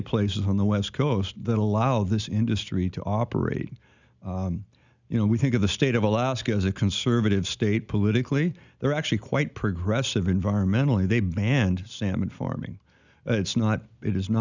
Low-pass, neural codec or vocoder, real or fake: 7.2 kHz; none; real